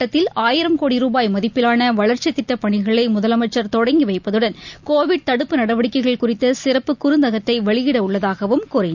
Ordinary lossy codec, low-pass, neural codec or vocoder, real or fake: none; 7.2 kHz; none; real